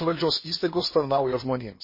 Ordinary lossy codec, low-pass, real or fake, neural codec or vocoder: MP3, 24 kbps; 5.4 kHz; fake; codec, 16 kHz in and 24 kHz out, 0.8 kbps, FocalCodec, streaming, 65536 codes